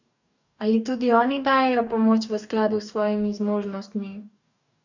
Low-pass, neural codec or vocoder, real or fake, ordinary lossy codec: 7.2 kHz; codec, 44.1 kHz, 2.6 kbps, DAC; fake; none